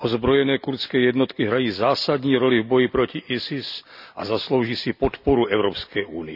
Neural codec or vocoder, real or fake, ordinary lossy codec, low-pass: none; real; none; 5.4 kHz